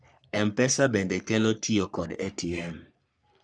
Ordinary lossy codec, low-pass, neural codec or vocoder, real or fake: none; 9.9 kHz; codec, 44.1 kHz, 3.4 kbps, Pupu-Codec; fake